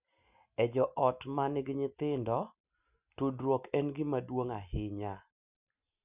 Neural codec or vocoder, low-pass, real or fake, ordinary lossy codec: none; 3.6 kHz; real; none